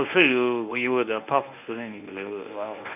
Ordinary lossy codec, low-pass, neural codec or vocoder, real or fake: none; 3.6 kHz; codec, 24 kHz, 0.9 kbps, WavTokenizer, medium speech release version 1; fake